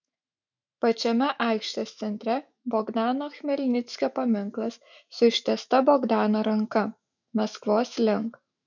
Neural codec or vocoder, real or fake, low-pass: autoencoder, 48 kHz, 128 numbers a frame, DAC-VAE, trained on Japanese speech; fake; 7.2 kHz